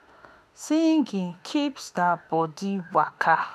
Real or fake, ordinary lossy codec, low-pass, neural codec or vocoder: fake; none; 14.4 kHz; autoencoder, 48 kHz, 32 numbers a frame, DAC-VAE, trained on Japanese speech